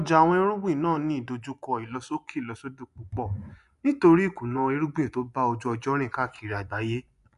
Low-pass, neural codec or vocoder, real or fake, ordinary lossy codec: 10.8 kHz; vocoder, 24 kHz, 100 mel bands, Vocos; fake; none